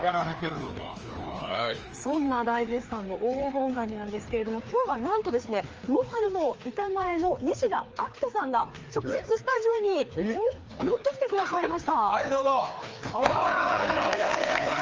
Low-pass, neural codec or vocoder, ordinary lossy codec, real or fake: 7.2 kHz; codec, 16 kHz, 2 kbps, FreqCodec, larger model; Opus, 24 kbps; fake